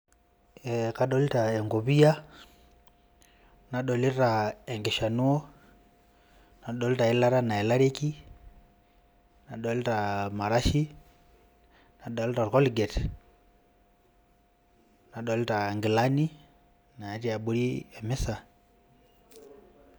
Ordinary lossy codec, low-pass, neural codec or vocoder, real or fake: none; none; none; real